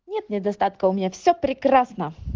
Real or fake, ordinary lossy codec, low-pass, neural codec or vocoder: real; Opus, 16 kbps; 7.2 kHz; none